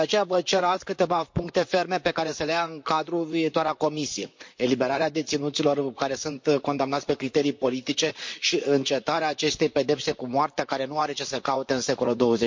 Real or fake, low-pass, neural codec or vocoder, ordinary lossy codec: fake; 7.2 kHz; vocoder, 44.1 kHz, 128 mel bands, Pupu-Vocoder; MP3, 48 kbps